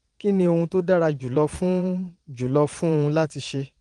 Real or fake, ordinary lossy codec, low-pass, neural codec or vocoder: fake; Opus, 24 kbps; 9.9 kHz; vocoder, 22.05 kHz, 80 mel bands, Vocos